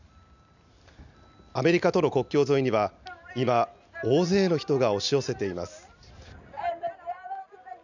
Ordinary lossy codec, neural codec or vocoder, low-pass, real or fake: none; none; 7.2 kHz; real